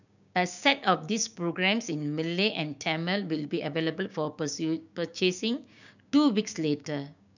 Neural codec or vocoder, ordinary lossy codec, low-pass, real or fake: codec, 16 kHz, 6 kbps, DAC; none; 7.2 kHz; fake